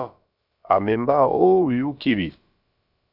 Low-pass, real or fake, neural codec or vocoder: 5.4 kHz; fake; codec, 16 kHz, about 1 kbps, DyCAST, with the encoder's durations